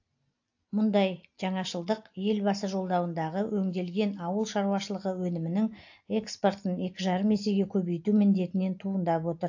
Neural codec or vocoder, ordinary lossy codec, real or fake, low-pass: none; AAC, 48 kbps; real; 7.2 kHz